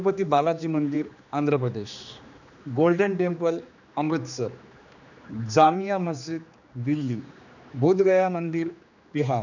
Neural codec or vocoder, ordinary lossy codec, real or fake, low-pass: codec, 16 kHz, 2 kbps, X-Codec, HuBERT features, trained on general audio; none; fake; 7.2 kHz